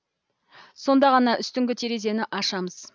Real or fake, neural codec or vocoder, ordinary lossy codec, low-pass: real; none; none; none